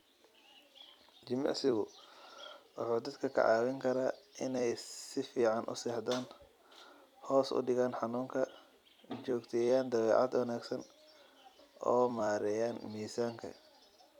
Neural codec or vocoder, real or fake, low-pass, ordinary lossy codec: vocoder, 44.1 kHz, 128 mel bands every 256 samples, BigVGAN v2; fake; 19.8 kHz; none